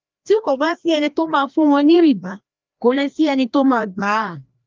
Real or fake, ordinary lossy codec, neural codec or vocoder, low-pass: fake; Opus, 24 kbps; codec, 16 kHz, 1 kbps, FreqCodec, larger model; 7.2 kHz